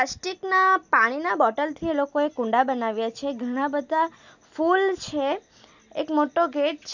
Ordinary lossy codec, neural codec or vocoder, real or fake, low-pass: none; none; real; 7.2 kHz